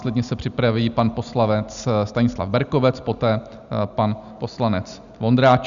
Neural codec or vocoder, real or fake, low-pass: none; real; 7.2 kHz